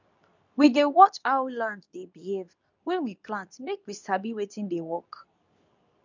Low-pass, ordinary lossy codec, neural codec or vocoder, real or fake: 7.2 kHz; none; codec, 24 kHz, 0.9 kbps, WavTokenizer, medium speech release version 2; fake